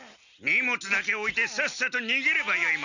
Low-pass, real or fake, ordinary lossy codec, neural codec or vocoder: 7.2 kHz; real; none; none